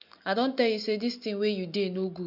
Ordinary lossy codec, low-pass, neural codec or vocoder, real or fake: none; 5.4 kHz; none; real